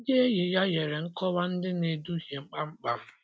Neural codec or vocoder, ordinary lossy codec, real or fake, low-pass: none; none; real; none